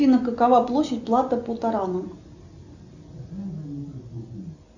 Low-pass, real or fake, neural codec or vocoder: 7.2 kHz; real; none